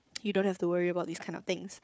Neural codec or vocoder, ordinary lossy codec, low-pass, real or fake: codec, 16 kHz, 4 kbps, FunCodec, trained on Chinese and English, 50 frames a second; none; none; fake